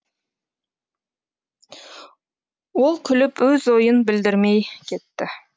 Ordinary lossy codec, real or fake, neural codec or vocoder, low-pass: none; real; none; none